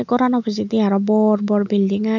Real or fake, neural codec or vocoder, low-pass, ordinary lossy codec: real; none; 7.2 kHz; none